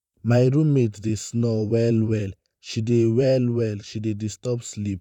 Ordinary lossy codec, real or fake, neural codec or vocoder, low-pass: none; fake; vocoder, 44.1 kHz, 128 mel bands, Pupu-Vocoder; 19.8 kHz